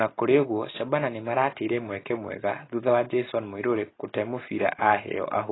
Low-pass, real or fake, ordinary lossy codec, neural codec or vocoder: 7.2 kHz; real; AAC, 16 kbps; none